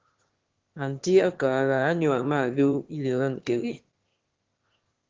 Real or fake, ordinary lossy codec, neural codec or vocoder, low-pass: fake; Opus, 16 kbps; autoencoder, 22.05 kHz, a latent of 192 numbers a frame, VITS, trained on one speaker; 7.2 kHz